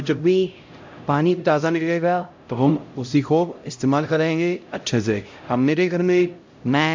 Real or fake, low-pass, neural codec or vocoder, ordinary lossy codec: fake; 7.2 kHz; codec, 16 kHz, 0.5 kbps, X-Codec, HuBERT features, trained on LibriSpeech; MP3, 48 kbps